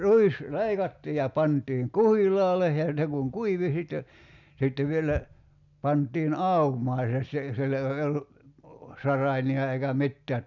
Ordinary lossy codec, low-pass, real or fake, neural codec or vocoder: none; 7.2 kHz; real; none